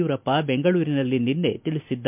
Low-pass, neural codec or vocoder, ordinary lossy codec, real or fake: 3.6 kHz; none; MP3, 32 kbps; real